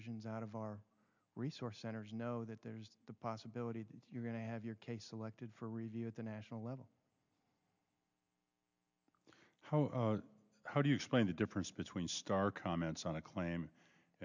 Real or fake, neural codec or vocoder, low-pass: real; none; 7.2 kHz